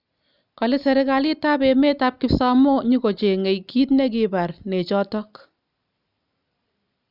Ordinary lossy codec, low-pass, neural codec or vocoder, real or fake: none; 5.4 kHz; none; real